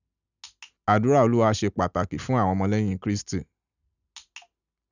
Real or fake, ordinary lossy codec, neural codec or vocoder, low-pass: real; none; none; 7.2 kHz